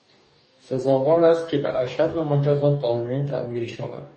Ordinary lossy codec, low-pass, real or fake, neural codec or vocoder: MP3, 32 kbps; 10.8 kHz; fake; codec, 44.1 kHz, 2.6 kbps, DAC